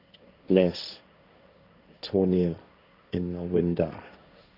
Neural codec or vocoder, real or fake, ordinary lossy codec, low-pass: codec, 16 kHz, 1.1 kbps, Voila-Tokenizer; fake; none; 5.4 kHz